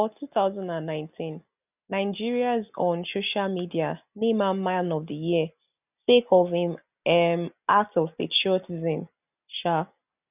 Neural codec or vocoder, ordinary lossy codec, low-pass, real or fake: none; none; 3.6 kHz; real